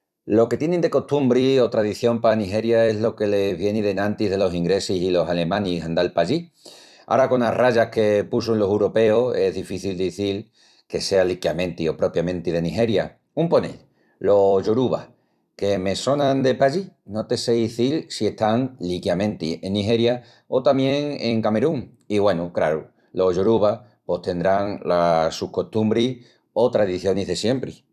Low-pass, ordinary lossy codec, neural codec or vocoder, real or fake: 14.4 kHz; none; vocoder, 44.1 kHz, 128 mel bands every 256 samples, BigVGAN v2; fake